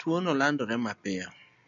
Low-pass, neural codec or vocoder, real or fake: 7.2 kHz; none; real